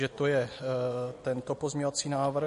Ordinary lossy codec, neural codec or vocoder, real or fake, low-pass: MP3, 48 kbps; none; real; 14.4 kHz